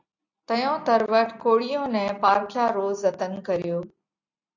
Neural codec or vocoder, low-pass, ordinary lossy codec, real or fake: none; 7.2 kHz; AAC, 48 kbps; real